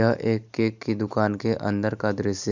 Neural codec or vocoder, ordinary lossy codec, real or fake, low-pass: none; none; real; 7.2 kHz